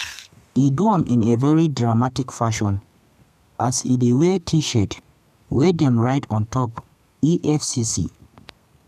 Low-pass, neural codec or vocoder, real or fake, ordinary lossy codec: 14.4 kHz; codec, 32 kHz, 1.9 kbps, SNAC; fake; none